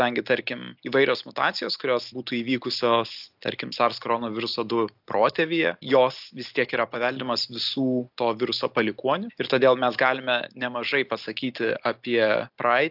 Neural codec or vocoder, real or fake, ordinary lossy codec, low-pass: none; real; AAC, 48 kbps; 5.4 kHz